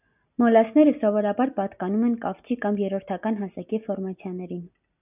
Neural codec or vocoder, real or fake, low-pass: none; real; 3.6 kHz